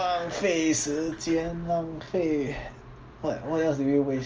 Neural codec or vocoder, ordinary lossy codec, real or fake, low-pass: none; Opus, 24 kbps; real; 7.2 kHz